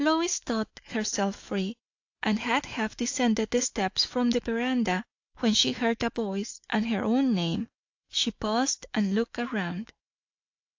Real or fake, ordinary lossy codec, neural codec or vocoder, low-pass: fake; AAC, 48 kbps; autoencoder, 48 kHz, 128 numbers a frame, DAC-VAE, trained on Japanese speech; 7.2 kHz